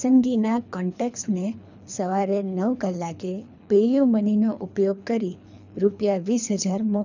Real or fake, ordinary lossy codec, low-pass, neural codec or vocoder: fake; none; 7.2 kHz; codec, 24 kHz, 3 kbps, HILCodec